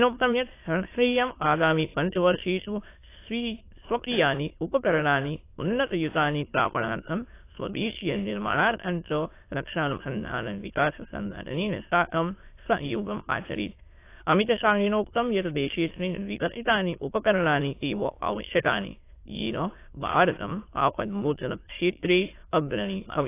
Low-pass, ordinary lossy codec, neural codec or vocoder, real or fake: 3.6 kHz; AAC, 24 kbps; autoencoder, 22.05 kHz, a latent of 192 numbers a frame, VITS, trained on many speakers; fake